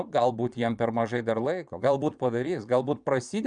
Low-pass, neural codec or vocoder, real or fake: 9.9 kHz; vocoder, 22.05 kHz, 80 mel bands, Vocos; fake